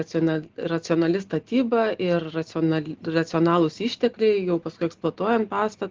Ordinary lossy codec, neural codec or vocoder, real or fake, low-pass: Opus, 16 kbps; none; real; 7.2 kHz